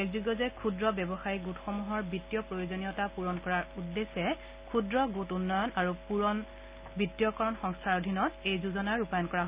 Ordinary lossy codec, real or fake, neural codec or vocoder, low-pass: Opus, 64 kbps; real; none; 3.6 kHz